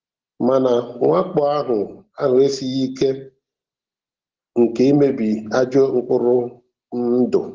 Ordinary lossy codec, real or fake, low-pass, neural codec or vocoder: Opus, 16 kbps; real; 7.2 kHz; none